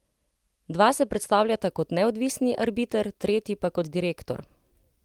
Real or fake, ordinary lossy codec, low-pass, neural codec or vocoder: real; Opus, 24 kbps; 19.8 kHz; none